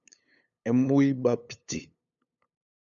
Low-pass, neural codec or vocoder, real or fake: 7.2 kHz; codec, 16 kHz, 8 kbps, FunCodec, trained on LibriTTS, 25 frames a second; fake